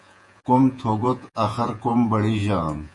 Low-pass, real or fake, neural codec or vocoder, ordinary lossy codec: 10.8 kHz; fake; vocoder, 48 kHz, 128 mel bands, Vocos; MP3, 64 kbps